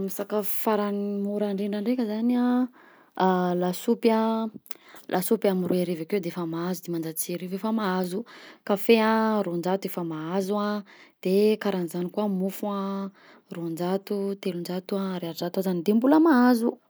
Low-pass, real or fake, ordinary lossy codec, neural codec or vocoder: none; real; none; none